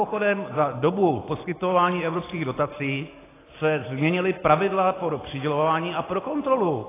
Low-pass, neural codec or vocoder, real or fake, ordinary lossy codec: 3.6 kHz; none; real; AAC, 16 kbps